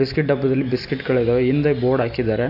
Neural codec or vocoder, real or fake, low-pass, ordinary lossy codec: none; real; 5.4 kHz; AAC, 32 kbps